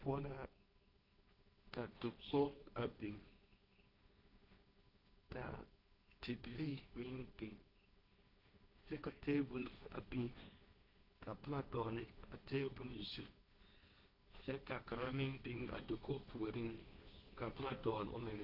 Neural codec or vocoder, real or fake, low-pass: codec, 16 kHz, 1.1 kbps, Voila-Tokenizer; fake; 5.4 kHz